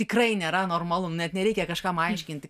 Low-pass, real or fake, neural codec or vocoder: 14.4 kHz; real; none